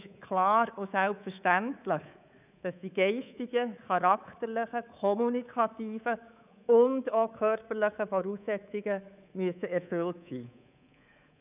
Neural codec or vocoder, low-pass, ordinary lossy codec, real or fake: codec, 24 kHz, 3.1 kbps, DualCodec; 3.6 kHz; none; fake